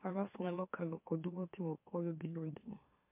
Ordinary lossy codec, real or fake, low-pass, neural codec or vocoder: MP3, 32 kbps; fake; 3.6 kHz; autoencoder, 44.1 kHz, a latent of 192 numbers a frame, MeloTTS